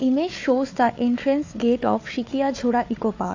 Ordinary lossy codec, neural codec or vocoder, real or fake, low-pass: AAC, 48 kbps; codec, 16 kHz, 4 kbps, FunCodec, trained on Chinese and English, 50 frames a second; fake; 7.2 kHz